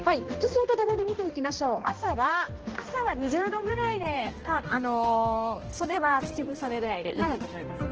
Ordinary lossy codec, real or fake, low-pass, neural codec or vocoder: Opus, 16 kbps; fake; 7.2 kHz; codec, 16 kHz, 1 kbps, X-Codec, HuBERT features, trained on balanced general audio